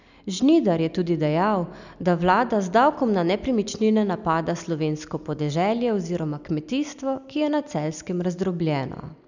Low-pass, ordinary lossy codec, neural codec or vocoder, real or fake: 7.2 kHz; none; none; real